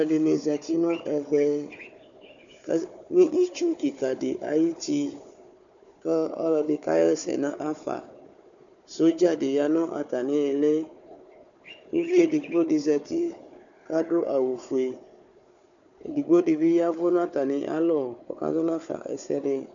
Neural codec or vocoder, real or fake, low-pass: codec, 16 kHz, 4 kbps, FunCodec, trained on Chinese and English, 50 frames a second; fake; 7.2 kHz